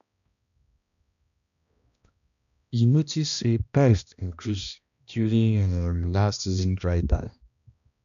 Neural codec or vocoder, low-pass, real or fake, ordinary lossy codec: codec, 16 kHz, 1 kbps, X-Codec, HuBERT features, trained on balanced general audio; 7.2 kHz; fake; none